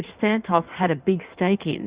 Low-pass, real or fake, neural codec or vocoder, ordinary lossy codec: 3.6 kHz; fake; codec, 16 kHz in and 24 kHz out, 1.1 kbps, FireRedTTS-2 codec; Opus, 64 kbps